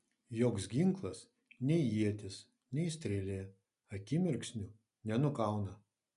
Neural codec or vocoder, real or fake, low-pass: none; real; 10.8 kHz